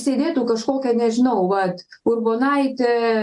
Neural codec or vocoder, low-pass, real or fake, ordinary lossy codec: none; 10.8 kHz; real; AAC, 64 kbps